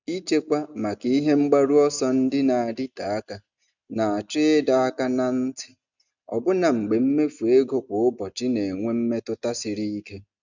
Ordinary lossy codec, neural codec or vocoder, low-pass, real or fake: none; none; 7.2 kHz; real